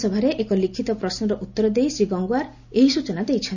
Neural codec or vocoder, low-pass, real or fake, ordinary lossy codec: none; 7.2 kHz; real; none